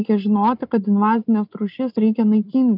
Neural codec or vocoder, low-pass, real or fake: none; 5.4 kHz; real